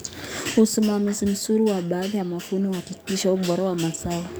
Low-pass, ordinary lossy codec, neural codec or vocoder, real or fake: none; none; codec, 44.1 kHz, 7.8 kbps, DAC; fake